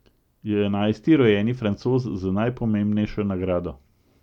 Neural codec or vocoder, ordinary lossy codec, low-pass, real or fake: none; none; 19.8 kHz; real